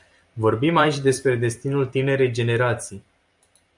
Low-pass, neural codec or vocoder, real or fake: 10.8 kHz; vocoder, 44.1 kHz, 128 mel bands every 256 samples, BigVGAN v2; fake